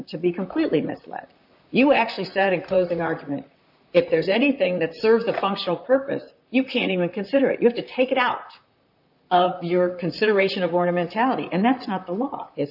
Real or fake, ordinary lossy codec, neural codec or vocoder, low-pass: fake; AAC, 48 kbps; vocoder, 44.1 kHz, 128 mel bands, Pupu-Vocoder; 5.4 kHz